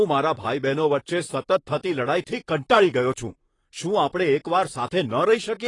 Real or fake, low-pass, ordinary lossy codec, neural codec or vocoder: fake; 10.8 kHz; AAC, 32 kbps; vocoder, 44.1 kHz, 128 mel bands, Pupu-Vocoder